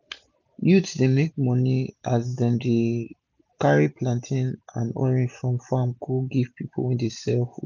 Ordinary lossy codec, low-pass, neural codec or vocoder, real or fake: none; 7.2 kHz; none; real